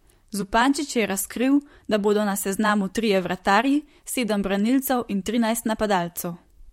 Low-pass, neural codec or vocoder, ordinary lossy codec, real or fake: 19.8 kHz; vocoder, 44.1 kHz, 128 mel bands, Pupu-Vocoder; MP3, 64 kbps; fake